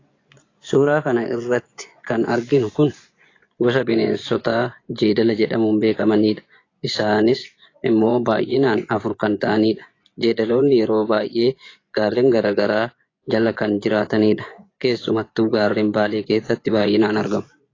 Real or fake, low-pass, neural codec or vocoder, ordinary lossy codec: fake; 7.2 kHz; vocoder, 24 kHz, 100 mel bands, Vocos; AAC, 32 kbps